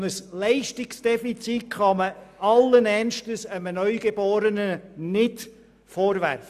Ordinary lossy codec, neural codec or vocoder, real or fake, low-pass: AAC, 96 kbps; none; real; 14.4 kHz